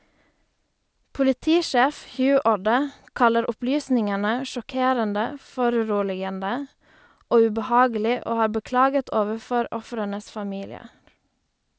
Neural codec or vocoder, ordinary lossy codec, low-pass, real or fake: none; none; none; real